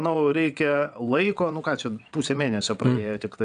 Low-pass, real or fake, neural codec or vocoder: 9.9 kHz; fake; vocoder, 22.05 kHz, 80 mel bands, WaveNeXt